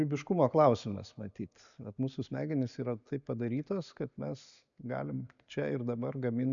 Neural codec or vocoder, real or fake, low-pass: none; real; 7.2 kHz